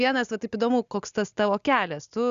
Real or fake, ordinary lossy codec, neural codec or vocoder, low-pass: real; Opus, 64 kbps; none; 7.2 kHz